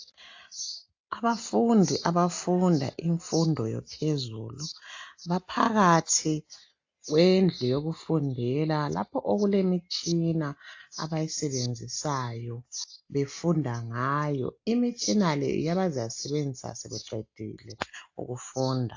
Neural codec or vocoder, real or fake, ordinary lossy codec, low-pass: none; real; AAC, 48 kbps; 7.2 kHz